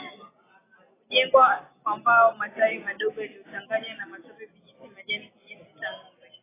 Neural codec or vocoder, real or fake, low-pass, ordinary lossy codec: none; real; 3.6 kHz; AAC, 16 kbps